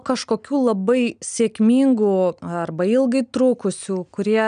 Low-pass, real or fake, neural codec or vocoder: 9.9 kHz; real; none